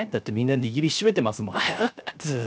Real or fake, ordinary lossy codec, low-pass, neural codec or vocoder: fake; none; none; codec, 16 kHz, 0.7 kbps, FocalCodec